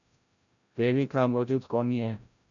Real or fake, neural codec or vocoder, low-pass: fake; codec, 16 kHz, 0.5 kbps, FreqCodec, larger model; 7.2 kHz